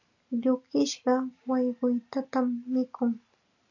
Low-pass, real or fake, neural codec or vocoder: 7.2 kHz; real; none